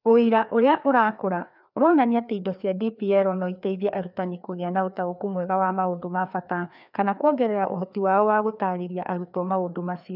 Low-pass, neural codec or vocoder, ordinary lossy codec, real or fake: 5.4 kHz; codec, 16 kHz, 2 kbps, FreqCodec, larger model; none; fake